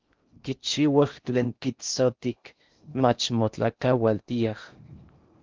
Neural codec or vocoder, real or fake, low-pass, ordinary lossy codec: codec, 16 kHz in and 24 kHz out, 0.6 kbps, FocalCodec, streaming, 4096 codes; fake; 7.2 kHz; Opus, 24 kbps